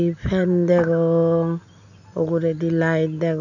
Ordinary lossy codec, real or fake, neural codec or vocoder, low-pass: none; real; none; 7.2 kHz